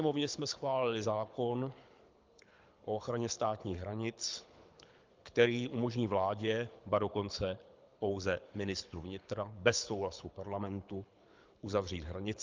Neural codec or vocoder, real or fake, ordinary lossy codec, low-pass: codec, 24 kHz, 6 kbps, HILCodec; fake; Opus, 24 kbps; 7.2 kHz